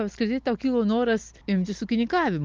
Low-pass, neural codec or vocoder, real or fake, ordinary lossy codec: 7.2 kHz; none; real; Opus, 32 kbps